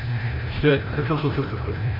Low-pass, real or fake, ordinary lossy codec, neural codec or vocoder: 5.4 kHz; fake; MP3, 24 kbps; codec, 16 kHz, 0.5 kbps, FreqCodec, larger model